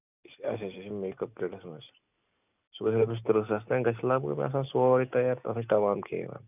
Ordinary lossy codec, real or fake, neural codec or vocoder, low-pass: none; real; none; 3.6 kHz